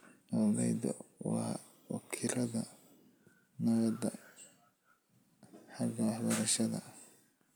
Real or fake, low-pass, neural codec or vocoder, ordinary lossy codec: real; none; none; none